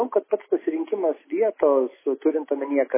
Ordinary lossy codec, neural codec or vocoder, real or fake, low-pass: MP3, 16 kbps; none; real; 3.6 kHz